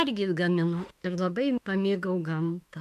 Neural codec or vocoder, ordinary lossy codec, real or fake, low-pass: autoencoder, 48 kHz, 32 numbers a frame, DAC-VAE, trained on Japanese speech; MP3, 96 kbps; fake; 14.4 kHz